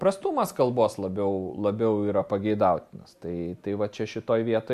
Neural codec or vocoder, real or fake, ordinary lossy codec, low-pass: none; real; MP3, 64 kbps; 14.4 kHz